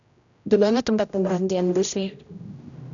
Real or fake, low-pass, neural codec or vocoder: fake; 7.2 kHz; codec, 16 kHz, 0.5 kbps, X-Codec, HuBERT features, trained on general audio